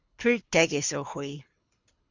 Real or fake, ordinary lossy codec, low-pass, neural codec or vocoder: fake; Opus, 64 kbps; 7.2 kHz; codec, 24 kHz, 6 kbps, HILCodec